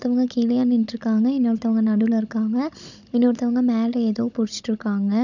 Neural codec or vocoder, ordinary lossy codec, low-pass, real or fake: codec, 16 kHz, 16 kbps, FunCodec, trained on Chinese and English, 50 frames a second; none; 7.2 kHz; fake